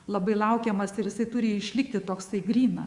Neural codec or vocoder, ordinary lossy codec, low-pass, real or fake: codec, 24 kHz, 3.1 kbps, DualCodec; Opus, 64 kbps; 10.8 kHz; fake